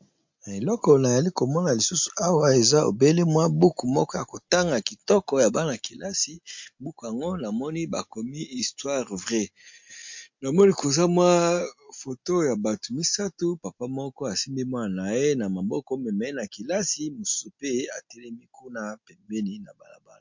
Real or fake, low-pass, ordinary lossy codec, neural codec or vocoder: real; 7.2 kHz; MP3, 48 kbps; none